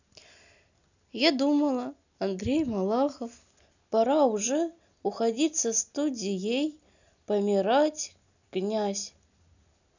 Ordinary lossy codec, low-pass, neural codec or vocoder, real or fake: none; 7.2 kHz; none; real